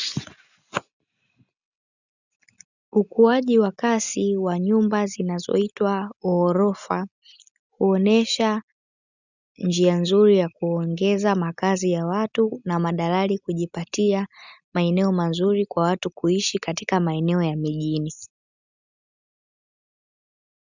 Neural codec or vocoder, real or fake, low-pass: none; real; 7.2 kHz